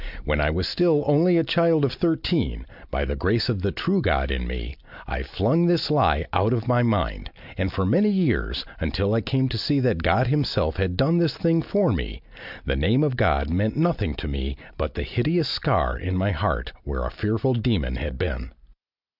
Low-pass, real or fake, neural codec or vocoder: 5.4 kHz; real; none